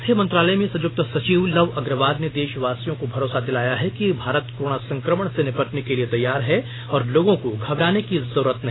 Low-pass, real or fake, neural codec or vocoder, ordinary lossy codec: 7.2 kHz; real; none; AAC, 16 kbps